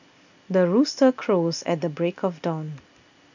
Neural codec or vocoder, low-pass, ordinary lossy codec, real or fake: none; 7.2 kHz; none; real